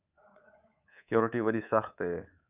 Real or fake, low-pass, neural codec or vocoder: fake; 3.6 kHz; codec, 24 kHz, 3.1 kbps, DualCodec